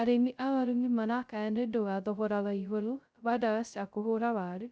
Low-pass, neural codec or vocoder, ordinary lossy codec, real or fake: none; codec, 16 kHz, 0.2 kbps, FocalCodec; none; fake